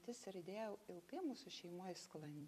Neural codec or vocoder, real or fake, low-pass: vocoder, 44.1 kHz, 128 mel bands every 256 samples, BigVGAN v2; fake; 14.4 kHz